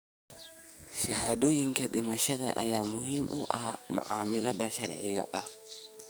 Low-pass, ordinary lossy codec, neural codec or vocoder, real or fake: none; none; codec, 44.1 kHz, 2.6 kbps, SNAC; fake